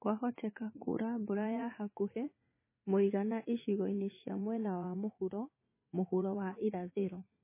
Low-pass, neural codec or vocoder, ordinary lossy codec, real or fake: 3.6 kHz; vocoder, 44.1 kHz, 80 mel bands, Vocos; MP3, 16 kbps; fake